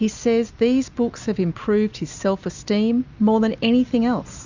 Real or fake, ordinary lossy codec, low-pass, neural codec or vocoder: real; Opus, 64 kbps; 7.2 kHz; none